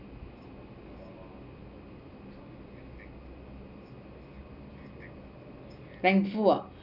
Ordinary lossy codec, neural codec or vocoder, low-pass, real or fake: none; none; 5.4 kHz; real